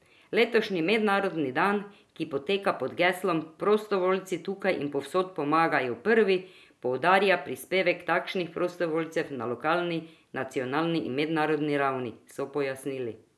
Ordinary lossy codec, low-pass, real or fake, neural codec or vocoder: none; none; real; none